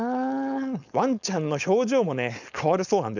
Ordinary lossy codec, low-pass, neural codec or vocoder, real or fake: none; 7.2 kHz; codec, 16 kHz, 4.8 kbps, FACodec; fake